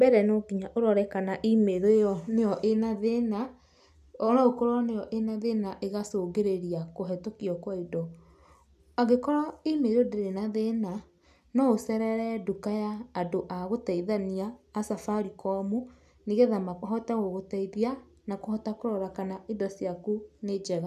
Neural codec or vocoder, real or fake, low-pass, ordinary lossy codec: none; real; 14.4 kHz; none